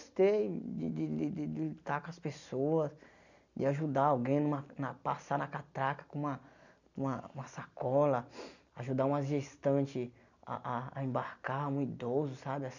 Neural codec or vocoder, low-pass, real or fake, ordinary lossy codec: none; 7.2 kHz; real; none